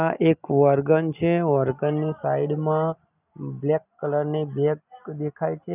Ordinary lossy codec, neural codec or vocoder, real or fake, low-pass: none; none; real; 3.6 kHz